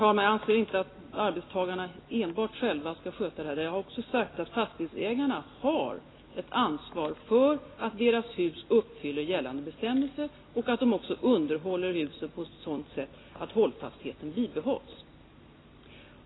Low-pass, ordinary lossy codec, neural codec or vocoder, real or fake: 7.2 kHz; AAC, 16 kbps; none; real